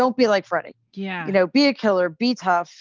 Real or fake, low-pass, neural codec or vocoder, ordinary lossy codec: real; 7.2 kHz; none; Opus, 24 kbps